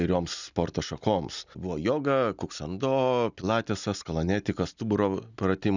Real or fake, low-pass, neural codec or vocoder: real; 7.2 kHz; none